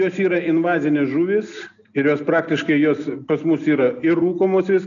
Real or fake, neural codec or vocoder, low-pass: real; none; 7.2 kHz